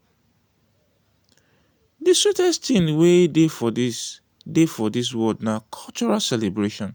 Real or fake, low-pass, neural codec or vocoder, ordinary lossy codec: real; none; none; none